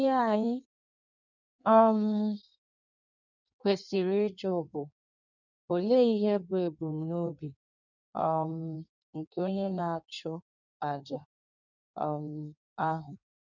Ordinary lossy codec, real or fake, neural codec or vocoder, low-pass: none; fake; codec, 16 kHz in and 24 kHz out, 1.1 kbps, FireRedTTS-2 codec; 7.2 kHz